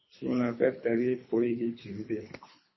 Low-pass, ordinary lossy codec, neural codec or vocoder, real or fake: 7.2 kHz; MP3, 24 kbps; codec, 24 kHz, 3 kbps, HILCodec; fake